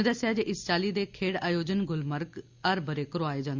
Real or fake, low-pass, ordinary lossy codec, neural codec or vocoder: real; 7.2 kHz; Opus, 64 kbps; none